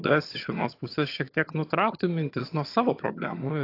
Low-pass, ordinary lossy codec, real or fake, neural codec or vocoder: 5.4 kHz; AAC, 32 kbps; fake; vocoder, 22.05 kHz, 80 mel bands, HiFi-GAN